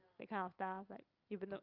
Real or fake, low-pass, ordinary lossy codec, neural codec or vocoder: real; 5.4 kHz; Opus, 24 kbps; none